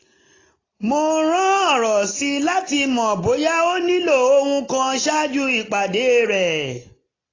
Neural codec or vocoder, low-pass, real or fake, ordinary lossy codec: none; 7.2 kHz; real; AAC, 32 kbps